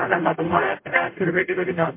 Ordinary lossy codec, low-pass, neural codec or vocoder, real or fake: MP3, 24 kbps; 3.6 kHz; codec, 44.1 kHz, 0.9 kbps, DAC; fake